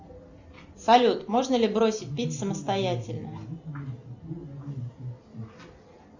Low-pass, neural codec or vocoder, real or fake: 7.2 kHz; none; real